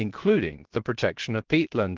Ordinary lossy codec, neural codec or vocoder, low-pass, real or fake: Opus, 16 kbps; codec, 16 kHz, 0.7 kbps, FocalCodec; 7.2 kHz; fake